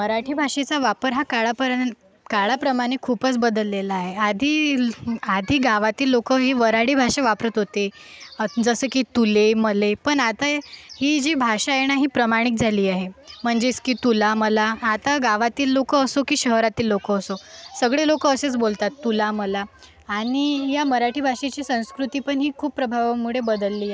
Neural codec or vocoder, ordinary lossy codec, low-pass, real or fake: none; none; none; real